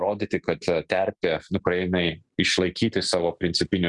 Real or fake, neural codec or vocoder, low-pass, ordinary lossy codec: real; none; 10.8 kHz; Opus, 64 kbps